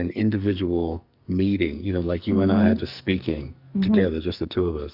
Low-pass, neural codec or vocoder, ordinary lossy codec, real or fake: 5.4 kHz; codec, 44.1 kHz, 2.6 kbps, SNAC; Opus, 64 kbps; fake